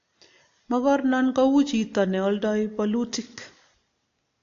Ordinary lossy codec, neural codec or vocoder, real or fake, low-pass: AAC, 48 kbps; none; real; 7.2 kHz